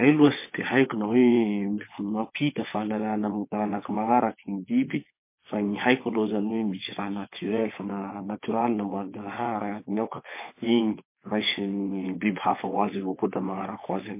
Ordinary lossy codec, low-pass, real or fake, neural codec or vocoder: MP3, 24 kbps; 3.6 kHz; fake; vocoder, 22.05 kHz, 80 mel bands, WaveNeXt